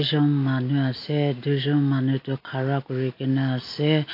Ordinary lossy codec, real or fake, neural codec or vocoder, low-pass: AAC, 32 kbps; real; none; 5.4 kHz